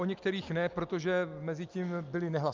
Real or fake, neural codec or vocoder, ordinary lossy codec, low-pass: real; none; Opus, 24 kbps; 7.2 kHz